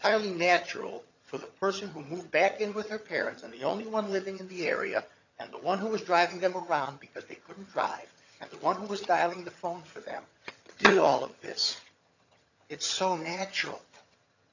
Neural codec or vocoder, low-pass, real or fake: vocoder, 22.05 kHz, 80 mel bands, HiFi-GAN; 7.2 kHz; fake